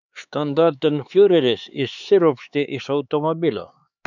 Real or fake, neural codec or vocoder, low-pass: fake; codec, 16 kHz, 4 kbps, X-Codec, HuBERT features, trained on LibriSpeech; 7.2 kHz